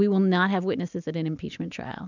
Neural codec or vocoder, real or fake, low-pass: none; real; 7.2 kHz